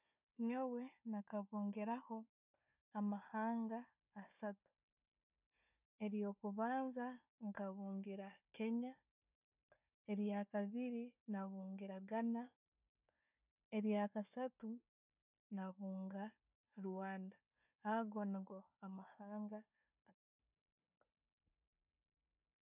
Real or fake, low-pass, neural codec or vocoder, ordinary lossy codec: fake; 3.6 kHz; codec, 16 kHz, 4 kbps, FunCodec, trained on Chinese and English, 50 frames a second; none